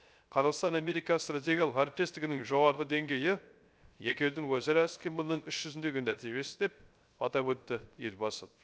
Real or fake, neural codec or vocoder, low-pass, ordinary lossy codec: fake; codec, 16 kHz, 0.3 kbps, FocalCodec; none; none